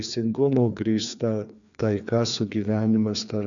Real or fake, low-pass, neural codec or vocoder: fake; 7.2 kHz; codec, 16 kHz, 2 kbps, FreqCodec, larger model